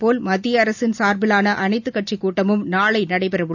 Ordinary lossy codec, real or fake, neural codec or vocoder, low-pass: none; real; none; 7.2 kHz